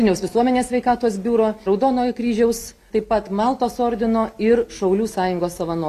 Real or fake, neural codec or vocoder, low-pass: real; none; 14.4 kHz